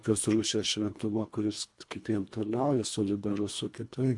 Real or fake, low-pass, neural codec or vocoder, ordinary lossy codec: fake; 10.8 kHz; codec, 24 kHz, 1.5 kbps, HILCodec; MP3, 64 kbps